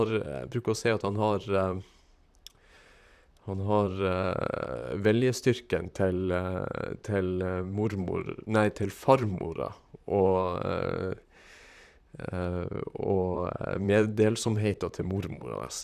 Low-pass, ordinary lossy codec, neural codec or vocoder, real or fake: 14.4 kHz; none; vocoder, 44.1 kHz, 128 mel bands, Pupu-Vocoder; fake